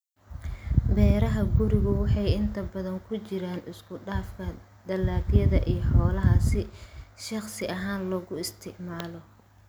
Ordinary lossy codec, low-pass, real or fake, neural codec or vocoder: none; none; real; none